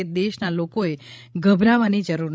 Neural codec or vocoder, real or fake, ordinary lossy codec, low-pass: codec, 16 kHz, 16 kbps, FreqCodec, larger model; fake; none; none